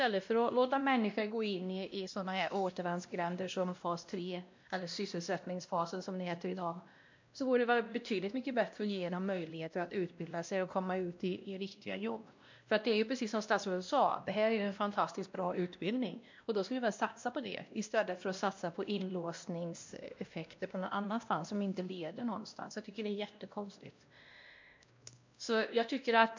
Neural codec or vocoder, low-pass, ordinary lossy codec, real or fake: codec, 16 kHz, 1 kbps, X-Codec, WavLM features, trained on Multilingual LibriSpeech; 7.2 kHz; MP3, 64 kbps; fake